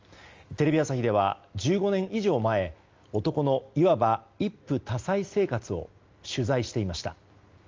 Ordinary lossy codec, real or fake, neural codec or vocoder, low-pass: Opus, 32 kbps; real; none; 7.2 kHz